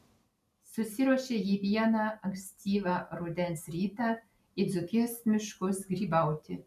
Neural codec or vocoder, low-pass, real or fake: none; 14.4 kHz; real